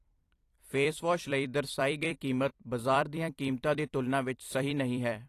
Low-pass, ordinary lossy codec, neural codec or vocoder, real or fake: 14.4 kHz; AAC, 48 kbps; vocoder, 44.1 kHz, 128 mel bands every 256 samples, BigVGAN v2; fake